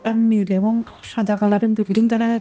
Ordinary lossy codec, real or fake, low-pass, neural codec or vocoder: none; fake; none; codec, 16 kHz, 1 kbps, X-Codec, HuBERT features, trained on balanced general audio